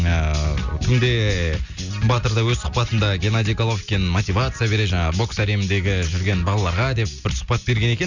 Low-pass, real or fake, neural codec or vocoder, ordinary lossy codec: 7.2 kHz; real; none; none